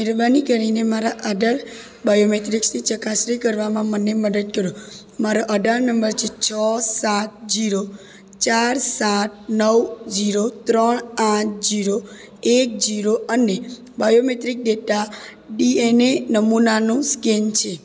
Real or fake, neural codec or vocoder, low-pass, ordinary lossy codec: real; none; none; none